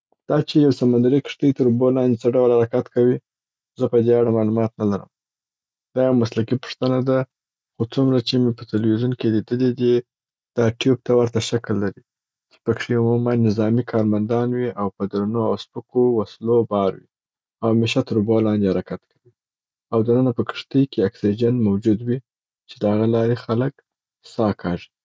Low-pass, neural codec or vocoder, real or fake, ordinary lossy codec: none; none; real; none